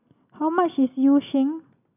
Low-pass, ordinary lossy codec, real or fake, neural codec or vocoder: 3.6 kHz; none; real; none